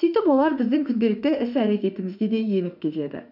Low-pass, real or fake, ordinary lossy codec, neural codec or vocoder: 5.4 kHz; fake; none; autoencoder, 48 kHz, 32 numbers a frame, DAC-VAE, trained on Japanese speech